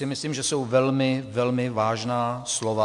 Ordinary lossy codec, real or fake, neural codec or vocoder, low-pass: MP3, 64 kbps; real; none; 10.8 kHz